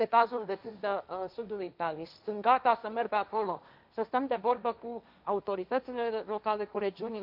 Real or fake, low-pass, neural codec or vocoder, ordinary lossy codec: fake; 5.4 kHz; codec, 16 kHz, 1.1 kbps, Voila-Tokenizer; none